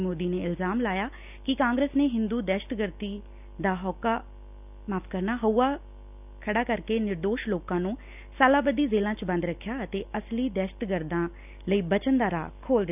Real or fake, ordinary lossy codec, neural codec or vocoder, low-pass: real; none; none; 3.6 kHz